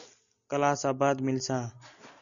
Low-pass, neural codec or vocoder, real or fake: 7.2 kHz; none; real